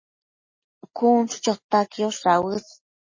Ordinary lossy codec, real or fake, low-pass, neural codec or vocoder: MP3, 32 kbps; real; 7.2 kHz; none